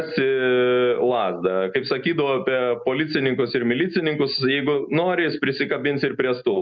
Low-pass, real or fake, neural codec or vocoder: 7.2 kHz; real; none